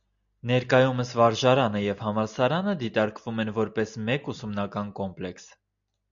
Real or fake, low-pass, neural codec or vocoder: real; 7.2 kHz; none